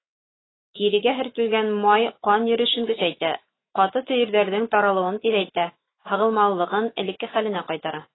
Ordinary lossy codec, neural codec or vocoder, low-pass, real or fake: AAC, 16 kbps; autoencoder, 48 kHz, 128 numbers a frame, DAC-VAE, trained on Japanese speech; 7.2 kHz; fake